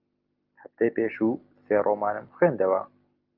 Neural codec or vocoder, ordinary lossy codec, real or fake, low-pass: none; Opus, 24 kbps; real; 5.4 kHz